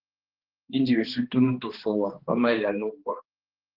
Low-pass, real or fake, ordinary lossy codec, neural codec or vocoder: 5.4 kHz; fake; Opus, 16 kbps; codec, 16 kHz, 2 kbps, X-Codec, HuBERT features, trained on balanced general audio